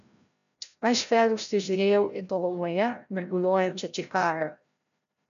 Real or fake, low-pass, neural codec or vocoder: fake; 7.2 kHz; codec, 16 kHz, 0.5 kbps, FreqCodec, larger model